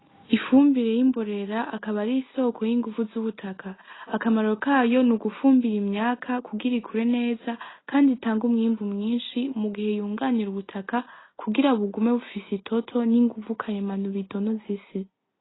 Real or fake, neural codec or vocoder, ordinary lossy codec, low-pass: real; none; AAC, 16 kbps; 7.2 kHz